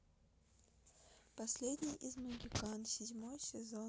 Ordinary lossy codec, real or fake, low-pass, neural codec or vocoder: none; real; none; none